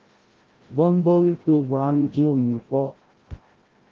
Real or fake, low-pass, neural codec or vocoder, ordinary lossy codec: fake; 7.2 kHz; codec, 16 kHz, 0.5 kbps, FreqCodec, larger model; Opus, 16 kbps